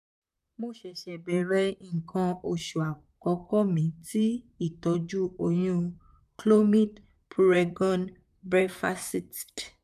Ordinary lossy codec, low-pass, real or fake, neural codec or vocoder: none; 14.4 kHz; fake; vocoder, 44.1 kHz, 128 mel bands, Pupu-Vocoder